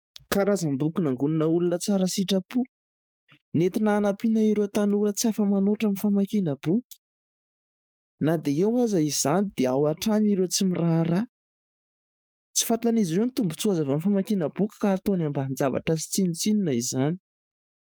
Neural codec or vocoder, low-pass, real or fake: codec, 44.1 kHz, 7.8 kbps, DAC; 19.8 kHz; fake